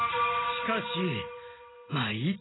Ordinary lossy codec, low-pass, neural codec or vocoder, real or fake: AAC, 16 kbps; 7.2 kHz; none; real